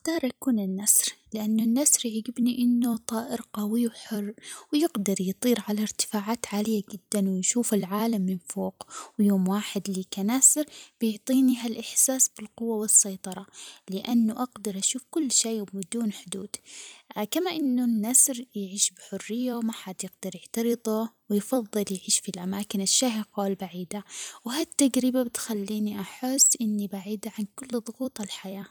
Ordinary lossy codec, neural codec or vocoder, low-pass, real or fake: none; vocoder, 44.1 kHz, 128 mel bands every 256 samples, BigVGAN v2; none; fake